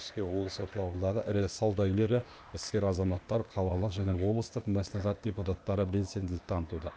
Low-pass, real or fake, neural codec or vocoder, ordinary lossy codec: none; fake; codec, 16 kHz, 0.8 kbps, ZipCodec; none